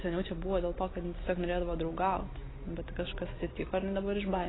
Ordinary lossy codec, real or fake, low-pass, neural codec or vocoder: AAC, 16 kbps; real; 7.2 kHz; none